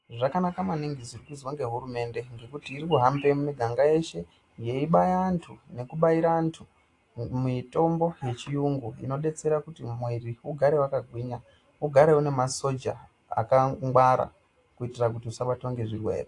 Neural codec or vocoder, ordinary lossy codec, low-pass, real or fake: none; AAC, 48 kbps; 10.8 kHz; real